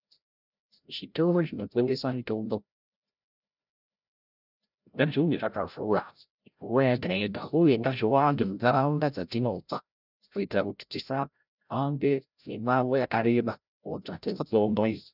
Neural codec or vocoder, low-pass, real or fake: codec, 16 kHz, 0.5 kbps, FreqCodec, larger model; 5.4 kHz; fake